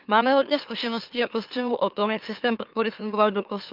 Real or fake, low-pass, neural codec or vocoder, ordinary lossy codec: fake; 5.4 kHz; autoencoder, 44.1 kHz, a latent of 192 numbers a frame, MeloTTS; Opus, 24 kbps